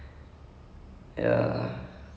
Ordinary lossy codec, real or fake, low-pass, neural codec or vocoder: none; real; none; none